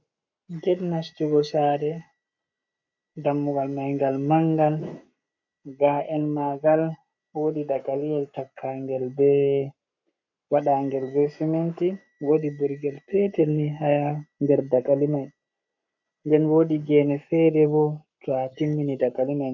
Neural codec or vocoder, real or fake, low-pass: codec, 44.1 kHz, 7.8 kbps, Pupu-Codec; fake; 7.2 kHz